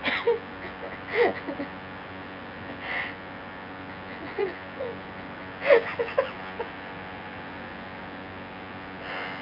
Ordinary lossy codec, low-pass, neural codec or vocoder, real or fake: none; 5.4 kHz; vocoder, 24 kHz, 100 mel bands, Vocos; fake